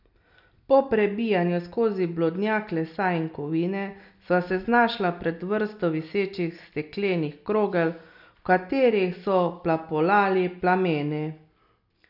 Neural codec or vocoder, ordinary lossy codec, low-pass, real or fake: none; none; 5.4 kHz; real